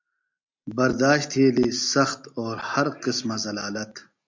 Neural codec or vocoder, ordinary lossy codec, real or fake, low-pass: none; MP3, 64 kbps; real; 7.2 kHz